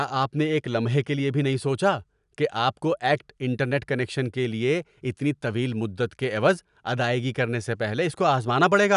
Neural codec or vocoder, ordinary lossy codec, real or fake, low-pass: none; none; real; 10.8 kHz